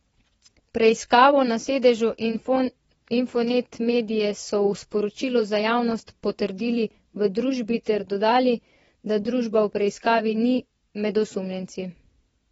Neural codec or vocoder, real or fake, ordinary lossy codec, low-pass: vocoder, 22.05 kHz, 80 mel bands, WaveNeXt; fake; AAC, 24 kbps; 9.9 kHz